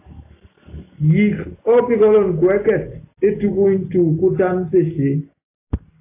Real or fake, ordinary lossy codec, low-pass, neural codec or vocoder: real; AAC, 24 kbps; 3.6 kHz; none